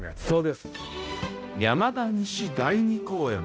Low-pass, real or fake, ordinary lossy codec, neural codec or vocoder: none; fake; none; codec, 16 kHz, 0.5 kbps, X-Codec, HuBERT features, trained on balanced general audio